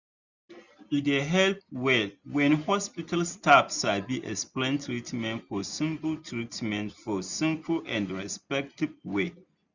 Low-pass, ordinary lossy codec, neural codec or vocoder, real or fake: 7.2 kHz; none; none; real